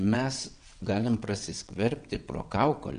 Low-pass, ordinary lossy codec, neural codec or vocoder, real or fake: 9.9 kHz; AAC, 96 kbps; vocoder, 22.05 kHz, 80 mel bands, WaveNeXt; fake